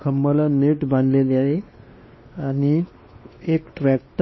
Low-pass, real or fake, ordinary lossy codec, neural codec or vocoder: 7.2 kHz; fake; MP3, 24 kbps; codec, 16 kHz, 2 kbps, X-Codec, WavLM features, trained on Multilingual LibriSpeech